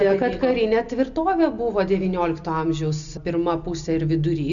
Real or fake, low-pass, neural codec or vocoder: real; 7.2 kHz; none